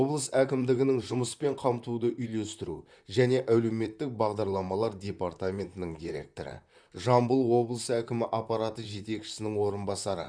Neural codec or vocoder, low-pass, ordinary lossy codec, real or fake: vocoder, 44.1 kHz, 128 mel bands, Pupu-Vocoder; 9.9 kHz; none; fake